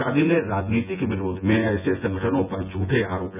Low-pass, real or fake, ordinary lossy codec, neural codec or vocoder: 3.6 kHz; fake; none; vocoder, 24 kHz, 100 mel bands, Vocos